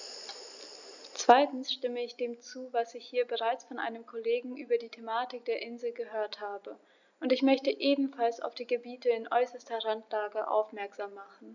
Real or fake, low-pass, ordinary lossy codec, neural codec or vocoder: real; 7.2 kHz; none; none